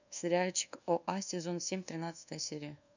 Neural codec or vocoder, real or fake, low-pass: codec, 24 kHz, 1.2 kbps, DualCodec; fake; 7.2 kHz